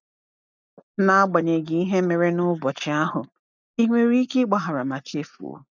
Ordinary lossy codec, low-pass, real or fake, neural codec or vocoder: none; 7.2 kHz; real; none